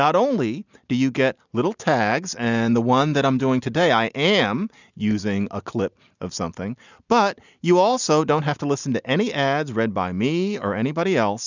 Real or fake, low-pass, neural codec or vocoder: real; 7.2 kHz; none